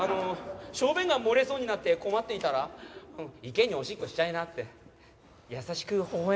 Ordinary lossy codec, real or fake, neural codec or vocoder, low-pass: none; real; none; none